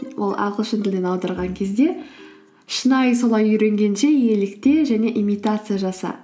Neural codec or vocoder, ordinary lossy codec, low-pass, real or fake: none; none; none; real